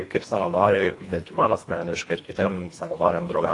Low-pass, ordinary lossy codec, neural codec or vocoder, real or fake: 10.8 kHz; AAC, 48 kbps; codec, 24 kHz, 1.5 kbps, HILCodec; fake